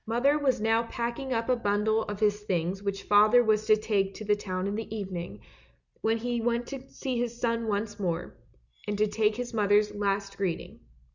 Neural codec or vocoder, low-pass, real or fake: none; 7.2 kHz; real